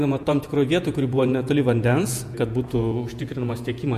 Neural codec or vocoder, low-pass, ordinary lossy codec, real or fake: vocoder, 48 kHz, 128 mel bands, Vocos; 14.4 kHz; MP3, 64 kbps; fake